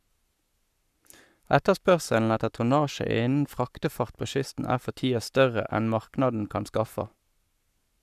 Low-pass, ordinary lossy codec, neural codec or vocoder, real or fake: 14.4 kHz; none; codec, 44.1 kHz, 7.8 kbps, Pupu-Codec; fake